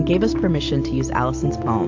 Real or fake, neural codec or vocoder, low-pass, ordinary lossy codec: real; none; 7.2 kHz; AAC, 48 kbps